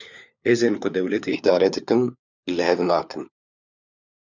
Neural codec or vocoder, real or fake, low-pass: codec, 16 kHz, 4 kbps, FunCodec, trained on LibriTTS, 50 frames a second; fake; 7.2 kHz